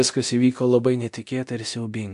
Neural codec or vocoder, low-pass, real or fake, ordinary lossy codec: codec, 24 kHz, 0.9 kbps, DualCodec; 10.8 kHz; fake; AAC, 64 kbps